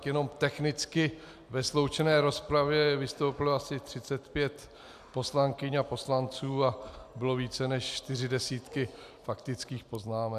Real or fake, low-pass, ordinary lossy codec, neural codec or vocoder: real; 14.4 kHz; AAC, 96 kbps; none